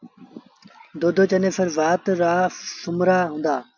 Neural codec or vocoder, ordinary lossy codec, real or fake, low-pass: none; MP3, 64 kbps; real; 7.2 kHz